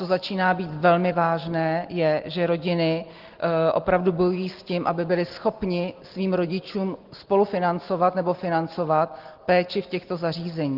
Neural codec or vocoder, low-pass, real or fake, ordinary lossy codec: none; 5.4 kHz; real; Opus, 16 kbps